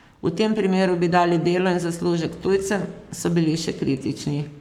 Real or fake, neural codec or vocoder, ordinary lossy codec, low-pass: fake; codec, 44.1 kHz, 7.8 kbps, Pupu-Codec; none; 19.8 kHz